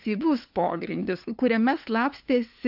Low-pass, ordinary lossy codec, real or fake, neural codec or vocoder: 5.4 kHz; AAC, 48 kbps; fake; codec, 16 kHz, 2 kbps, FunCodec, trained on LibriTTS, 25 frames a second